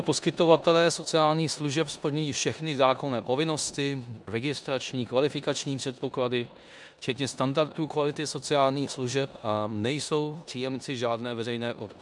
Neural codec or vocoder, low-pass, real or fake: codec, 16 kHz in and 24 kHz out, 0.9 kbps, LongCat-Audio-Codec, four codebook decoder; 10.8 kHz; fake